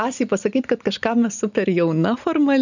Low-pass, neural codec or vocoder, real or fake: 7.2 kHz; none; real